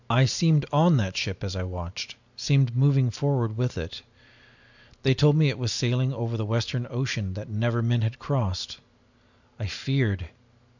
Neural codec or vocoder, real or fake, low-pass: none; real; 7.2 kHz